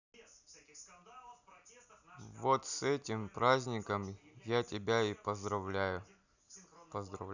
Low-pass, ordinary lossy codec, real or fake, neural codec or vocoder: 7.2 kHz; none; real; none